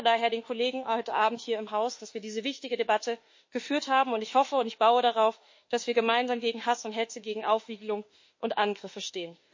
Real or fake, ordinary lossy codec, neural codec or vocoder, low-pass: fake; MP3, 32 kbps; autoencoder, 48 kHz, 32 numbers a frame, DAC-VAE, trained on Japanese speech; 7.2 kHz